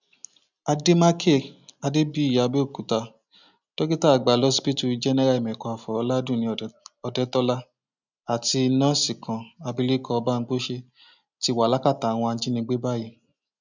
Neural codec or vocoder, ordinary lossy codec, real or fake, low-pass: none; none; real; 7.2 kHz